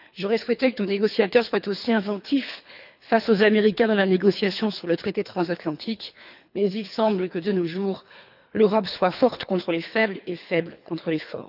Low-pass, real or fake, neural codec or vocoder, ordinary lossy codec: 5.4 kHz; fake; codec, 24 kHz, 3 kbps, HILCodec; none